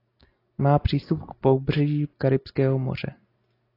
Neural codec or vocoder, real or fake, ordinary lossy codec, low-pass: none; real; AAC, 24 kbps; 5.4 kHz